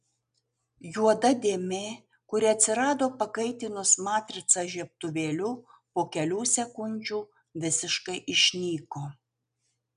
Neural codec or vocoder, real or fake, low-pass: none; real; 10.8 kHz